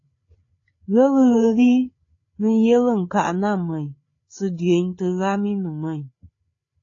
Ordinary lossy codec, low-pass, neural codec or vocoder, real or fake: AAC, 32 kbps; 7.2 kHz; codec, 16 kHz, 8 kbps, FreqCodec, larger model; fake